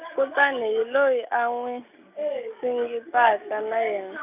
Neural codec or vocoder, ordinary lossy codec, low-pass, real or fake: none; none; 3.6 kHz; real